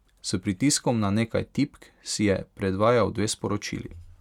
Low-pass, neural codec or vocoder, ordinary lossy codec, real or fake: 19.8 kHz; none; none; real